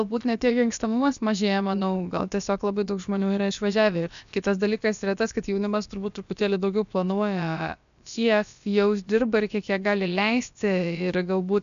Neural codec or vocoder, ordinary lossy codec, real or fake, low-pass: codec, 16 kHz, about 1 kbps, DyCAST, with the encoder's durations; AAC, 96 kbps; fake; 7.2 kHz